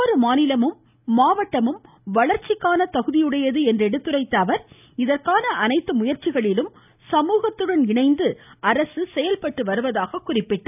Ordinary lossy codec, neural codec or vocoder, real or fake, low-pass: none; none; real; 3.6 kHz